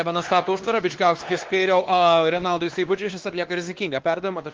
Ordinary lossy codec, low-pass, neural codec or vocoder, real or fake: Opus, 16 kbps; 7.2 kHz; codec, 16 kHz, 2 kbps, X-Codec, WavLM features, trained on Multilingual LibriSpeech; fake